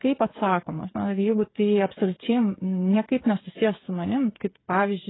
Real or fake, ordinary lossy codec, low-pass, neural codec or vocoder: fake; AAC, 16 kbps; 7.2 kHz; codec, 16 kHz, 8 kbps, FreqCodec, smaller model